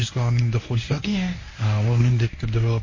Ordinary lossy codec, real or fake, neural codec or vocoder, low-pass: MP3, 32 kbps; fake; codec, 24 kHz, 0.9 kbps, WavTokenizer, medium speech release version 1; 7.2 kHz